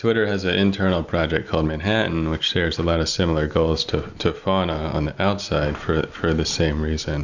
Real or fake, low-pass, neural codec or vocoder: real; 7.2 kHz; none